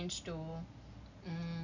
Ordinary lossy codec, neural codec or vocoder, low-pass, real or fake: AAC, 48 kbps; none; 7.2 kHz; real